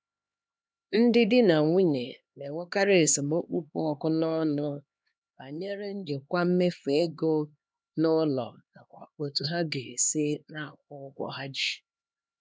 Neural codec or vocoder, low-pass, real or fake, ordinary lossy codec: codec, 16 kHz, 2 kbps, X-Codec, HuBERT features, trained on LibriSpeech; none; fake; none